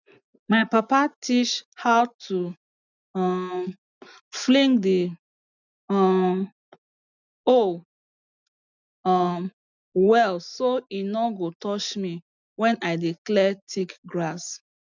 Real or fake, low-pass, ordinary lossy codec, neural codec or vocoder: real; 7.2 kHz; none; none